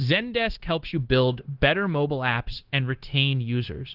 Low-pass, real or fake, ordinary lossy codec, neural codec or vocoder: 5.4 kHz; fake; Opus, 16 kbps; codec, 16 kHz, 0.9 kbps, LongCat-Audio-Codec